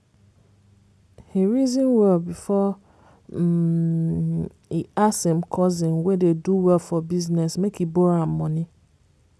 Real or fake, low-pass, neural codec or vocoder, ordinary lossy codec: real; none; none; none